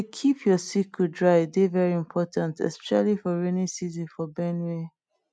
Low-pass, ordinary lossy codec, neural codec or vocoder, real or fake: none; none; none; real